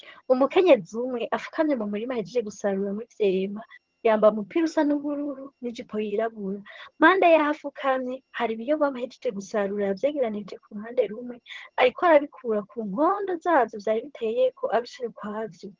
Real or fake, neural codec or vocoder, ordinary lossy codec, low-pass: fake; vocoder, 22.05 kHz, 80 mel bands, HiFi-GAN; Opus, 16 kbps; 7.2 kHz